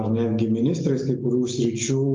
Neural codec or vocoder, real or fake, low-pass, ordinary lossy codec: none; real; 7.2 kHz; Opus, 24 kbps